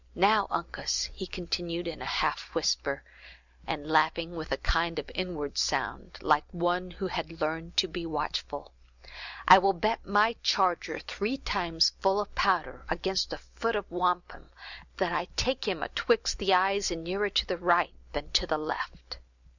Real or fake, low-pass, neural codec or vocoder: real; 7.2 kHz; none